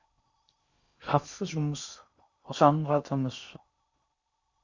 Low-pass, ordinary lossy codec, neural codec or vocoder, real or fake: 7.2 kHz; Opus, 64 kbps; codec, 16 kHz in and 24 kHz out, 0.6 kbps, FocalCodec, streaming, 4096 codes; fake